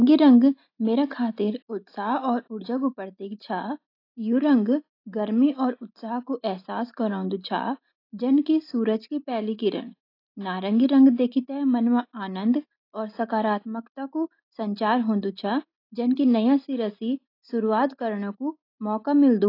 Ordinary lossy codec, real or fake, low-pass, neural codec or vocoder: AAC, 32 kbps; real; 5.4 kHz; none